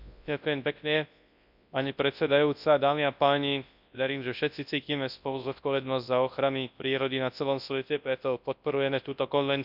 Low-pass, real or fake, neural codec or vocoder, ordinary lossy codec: 5.4 kHz; fake; codec, 24 kHz, 0.9 kbps, WavTokenizer, large speech release; none